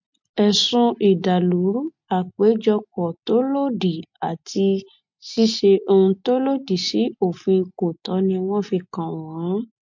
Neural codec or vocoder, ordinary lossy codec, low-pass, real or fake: none; MP3, 48 kbps; 7.2 kHz; real